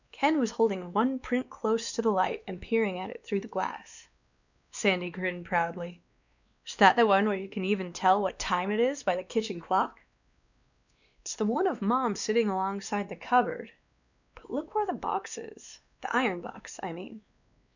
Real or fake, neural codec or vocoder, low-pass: fake; codec, 16 kHz, 2 kbps, X-Codec, WavLM features, trained on Multilingual LibriSpeech; 7.2 kHz